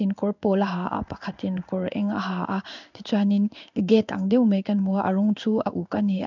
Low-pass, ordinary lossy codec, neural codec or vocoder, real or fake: 7.2 kHz; none; codec, 16 kHz in and 24 kHz out, 1 kbps, XY-Tokenizer; fake